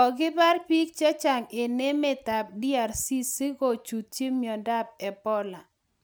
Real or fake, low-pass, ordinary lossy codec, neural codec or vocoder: real; none; none; none